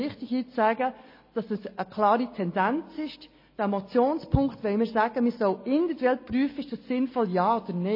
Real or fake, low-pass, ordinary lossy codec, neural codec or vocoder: real; 5.4 kHz; MP3, 24 kbps; none